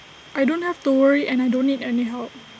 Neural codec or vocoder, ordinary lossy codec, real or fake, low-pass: none; none; real; none